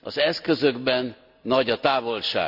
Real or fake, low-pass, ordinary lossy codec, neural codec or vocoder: real; 5.4 kHz; AAC, 48 kbps; none